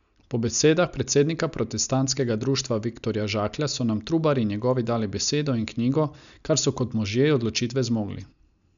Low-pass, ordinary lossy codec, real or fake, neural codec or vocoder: 7.2 kHz; none; real; none